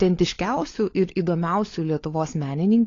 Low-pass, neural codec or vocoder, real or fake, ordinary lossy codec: 7.2 kHz; codec, 16 kHz, 16 kbps, FunCodec, trained on LibriTTS, 50 frames a second; fake; AAC, 32 kbps